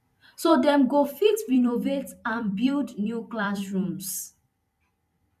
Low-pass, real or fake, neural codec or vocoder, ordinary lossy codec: 14.4 kHz; fake; vocoder, 44.1 kHz, 128 mel bands every 256 samples, BigVGAN v2; MP3, 96 kbps